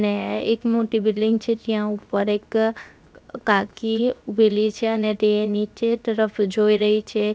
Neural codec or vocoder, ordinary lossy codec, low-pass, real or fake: codec, 16 kHz, 0.7 kbps, FocalCodec; none; none; fake